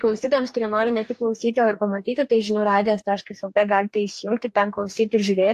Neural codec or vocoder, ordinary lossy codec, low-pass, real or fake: codec, 44.1 kHz, 2.6 kbps, DAC; AAC, 64 kbps; 14.4 kHz; fake